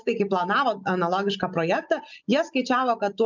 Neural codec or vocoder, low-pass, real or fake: none; 7.2 kHz; real